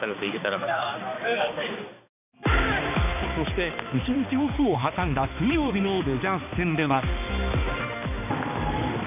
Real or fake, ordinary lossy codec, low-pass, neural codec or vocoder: fake; none; 3.6 kHz; codec, 16 kHz, 2 kbps, X-Codec, HuBERT features, trained on balanced general audio